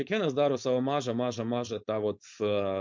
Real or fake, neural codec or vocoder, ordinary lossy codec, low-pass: fake; codec, 16 kHz, 4.8 kbps, FACodec; MP3, 64 kbps; 7.2 kHz